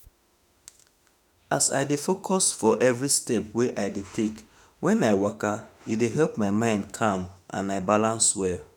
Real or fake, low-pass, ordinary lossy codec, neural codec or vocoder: fake; none; none; autoencoder, 48 kHz, 32 numbers a frame, DAC-VAE, trained on Japanese speech